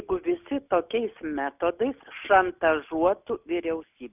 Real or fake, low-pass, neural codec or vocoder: real; 3.6 kHz; none